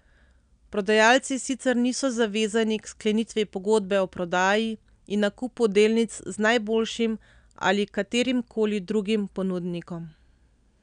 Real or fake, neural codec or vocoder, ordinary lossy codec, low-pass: real; none; none; 9.9 kHz